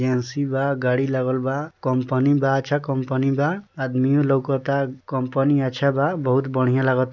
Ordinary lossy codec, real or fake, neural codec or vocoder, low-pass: none; real; none; 7.2 kHz